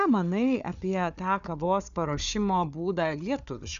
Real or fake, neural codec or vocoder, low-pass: fake; codec, 16 kHz, 4 kbps, FunCodec, trained on Chinese and English, 50 frames a second; 7.2 kHz